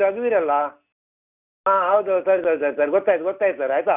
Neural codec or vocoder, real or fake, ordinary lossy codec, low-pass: none; real; none; 3.6 kHz